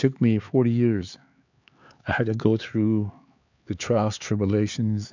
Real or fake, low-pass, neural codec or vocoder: fake; 7.2 kHz; codec, 16 kHz, 4 kbps, X-Codec, HuBERT features, trained on LibriSpeech